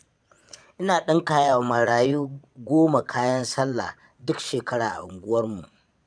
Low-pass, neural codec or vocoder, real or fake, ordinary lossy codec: 9.9 kHz; vocoder, 22.05 kHz, 80 mel bands, Vocos; fake; none